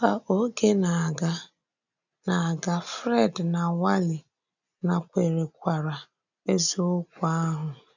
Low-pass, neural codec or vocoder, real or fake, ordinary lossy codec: 7.2 kHz; none; real; none